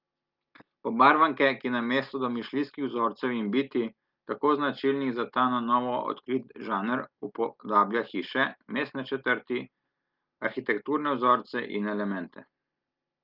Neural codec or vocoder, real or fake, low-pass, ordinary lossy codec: none; real; 5.4 kHz; Opus, 32 kbps